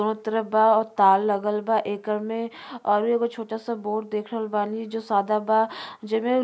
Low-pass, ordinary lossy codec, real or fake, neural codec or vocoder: none; none; real; none